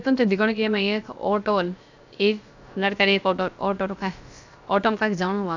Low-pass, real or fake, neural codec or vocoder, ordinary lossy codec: 7.2 kHz; fake; codec, 16 kHz, about 1 kbps, DyCAST, with the encoder's durations; none